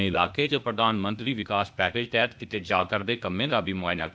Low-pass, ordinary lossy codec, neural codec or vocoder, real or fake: none; none; codec, 16 kHz, 0.8 kbps, ZipCodec; fake